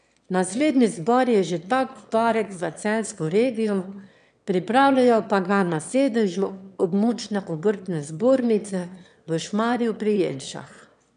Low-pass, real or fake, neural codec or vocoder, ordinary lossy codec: 9.9 kHz; fake; autoencoder, 22.05 kHz, a latent of 192 numbers a frame, VITS, trained on one speaker; none